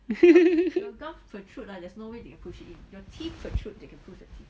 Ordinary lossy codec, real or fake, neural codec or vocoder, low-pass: none; real; none; none